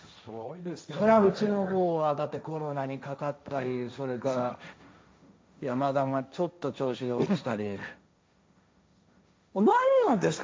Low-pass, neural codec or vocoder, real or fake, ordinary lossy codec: none; codec, 16 kHz, 1.1 kbps, Voila-Tokenizer; fake; none